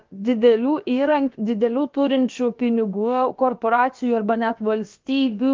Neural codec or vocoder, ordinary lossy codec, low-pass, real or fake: codec, 16 kHz, about 1 kbps, DyCAST, with the encoder's durations; Opus, 32 kbps; 7.2 kHz; fake